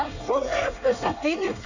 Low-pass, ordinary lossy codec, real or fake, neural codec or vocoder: 7.2 kHz; none; fake; codec, 24 kHz, 1 kbps, SNAC